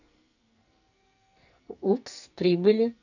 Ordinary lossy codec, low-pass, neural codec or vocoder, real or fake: none; 7.2 kHz; codec, 44.1 kHz, 2.6 kbps, SNAC; fake